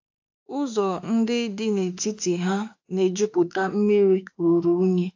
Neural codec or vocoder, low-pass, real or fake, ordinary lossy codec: autoencoder, 48 kHz, 32 numbers a frame, DAC-VAE, trained on Japanese speech; 7.2 kHz; fake; AAC, 48 kbps